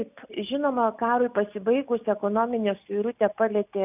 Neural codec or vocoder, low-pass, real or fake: none; 3.6 kHz; real